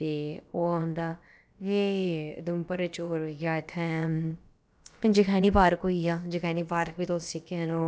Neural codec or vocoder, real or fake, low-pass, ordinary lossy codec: codec, 16 kHz, about 1 kbps, DyCAST, with the encoder's durations; fake; none; none